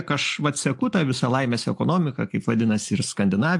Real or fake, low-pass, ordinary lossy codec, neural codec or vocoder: real; 10.8 kHz; AAC, 64 kbps; none